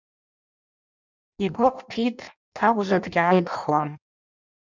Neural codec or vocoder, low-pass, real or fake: codec, 16 kHz in and 24 kHz out, 0.6 kbps, FireRedTTS-2 codec; 7.2 kHz; fake